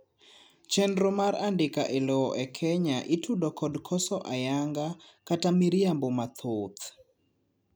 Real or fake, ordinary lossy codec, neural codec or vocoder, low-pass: real; none; none; none